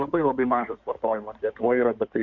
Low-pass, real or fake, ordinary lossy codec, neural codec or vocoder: 7.2 kHz; fake; MP3, 64 kbps; codec, 16 kHz in and 24 kHz out, 2.2 kbps, FireRedTTS-2 codec